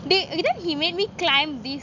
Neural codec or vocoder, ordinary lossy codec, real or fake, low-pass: none; none; real; 7.2 kHz